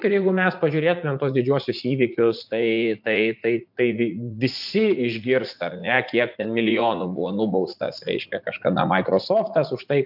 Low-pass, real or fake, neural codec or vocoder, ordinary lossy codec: 5.4 kHz; fake; vocoder, 44.1 kHz, 80 mel bands, Vocos; AAC, 48 kbps